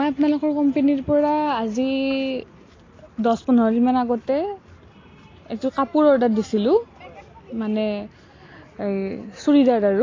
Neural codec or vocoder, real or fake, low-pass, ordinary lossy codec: none; real; 7.2 kHz; AAC, 32 kbps